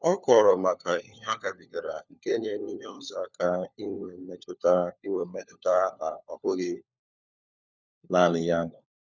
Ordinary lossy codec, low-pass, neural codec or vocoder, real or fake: none; 7.2 kHz; codec, 16 kHz, 4 kbps, FunCodec, trained on LibriTTS, 50 frames a second; fake